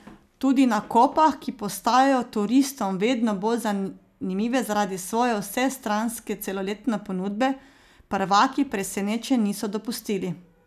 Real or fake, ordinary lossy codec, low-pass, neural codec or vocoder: real; none; 14.4 kHz; none